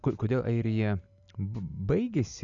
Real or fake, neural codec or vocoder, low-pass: real; none; 7.2 kHz